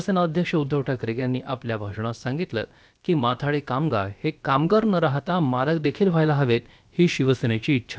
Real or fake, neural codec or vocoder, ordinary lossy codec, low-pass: fake; codec, 16 kHz, about 1 kbps, DyCAST, with the encoder's durations; none; none